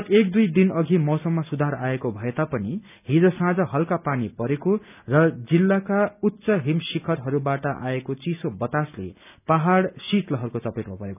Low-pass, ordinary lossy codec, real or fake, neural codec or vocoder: 3.6 kHz; none; real; none